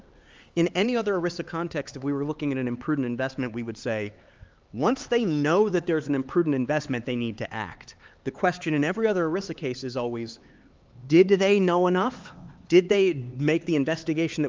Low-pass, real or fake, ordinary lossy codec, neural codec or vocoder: 7.2 kHz; fake; Opus, 32 kbps; codec, 16 kHz, 4 kbps, X-Codec, HuBERT features, trained on LibriSpeech